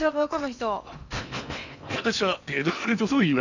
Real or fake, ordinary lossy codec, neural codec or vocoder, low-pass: fake; none; codec, 16 kHz in and 24 kHz out, 0.8 kbps, FocalCodec, streaming, 65536 codes; 7.2 kHz